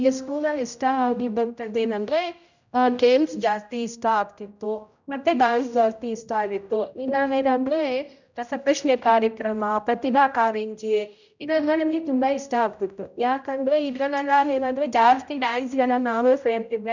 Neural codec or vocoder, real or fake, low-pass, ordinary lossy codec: codec, 16 kHz, 0.5 kbps, X-Codec, HuBERT features, trained on general audio; fake; 7.2 kHz; none